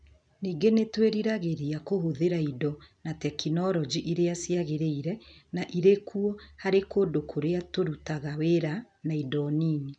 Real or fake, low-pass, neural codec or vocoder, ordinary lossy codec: real; 9.9 kHz; none; none